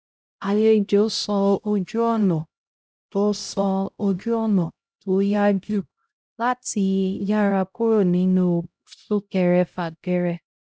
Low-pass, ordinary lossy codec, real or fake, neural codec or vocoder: none; none; fake; codec, 16 kHz, 0.5 kbps, X-Codec, HuBERT features, trained on LibriSpeech